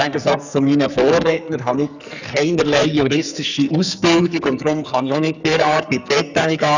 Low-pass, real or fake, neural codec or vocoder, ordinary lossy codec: 7.2 kHz; fake; codec, 44.1 kHz, 2.6 kbps, SNAC; none